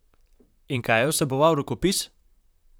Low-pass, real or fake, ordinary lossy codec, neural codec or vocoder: none; fake; none; vocoder, 44.1 kHz, 128 mel bands, Pupu-Vocoder